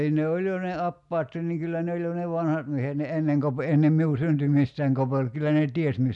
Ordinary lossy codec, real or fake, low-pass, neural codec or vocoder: none; real; 10.8 kHz; none